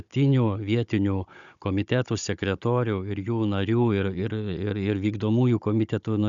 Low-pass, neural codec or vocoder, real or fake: 7.2 kHz; codec, 16 kHz, 16 kbps, FunCodec, trained on Chinese and English, 50 frames a second; fake